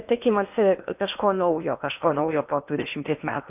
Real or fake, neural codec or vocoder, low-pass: fake; codec, 16 kHz in and 24 kHz out, 0.8 kbps, FocalCodec, streaming, 65536 codes; 3.6 kHz